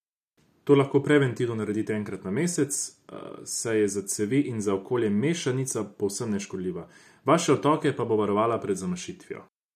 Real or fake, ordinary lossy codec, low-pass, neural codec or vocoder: real; none; 14.4 kHz; none